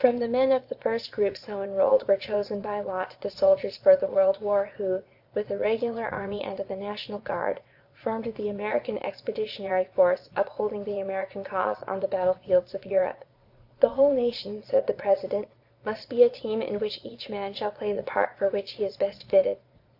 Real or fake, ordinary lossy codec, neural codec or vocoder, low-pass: fake; AAC, 48 kbps; vocoder, 22.05 kHz, 80 mel bands, Vocos; 5.4 kHz